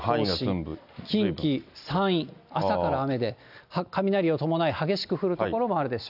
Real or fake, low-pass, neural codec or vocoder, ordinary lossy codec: real; 5.4 kHz; none; none